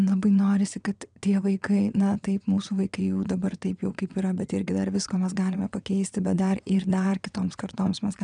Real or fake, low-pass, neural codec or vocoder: fake; 9.9 kHz; vocoder, 22.05 kHz, 80 mel bands, WaveNeXt